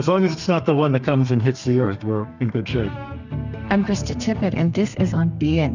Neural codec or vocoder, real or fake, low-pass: codec, 32 kHz, 1.9 kbps, SNAC; fake; 7.2 kHz